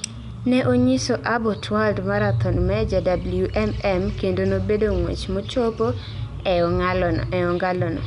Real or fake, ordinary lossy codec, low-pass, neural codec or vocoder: real; none; 10.8 kHz; none